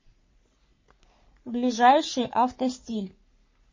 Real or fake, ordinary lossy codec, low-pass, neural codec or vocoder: fake; MP3, 32 kbps; 7.2 kHz; codec, 44.1 kHz, 3.4 kbps, Pupu-Codec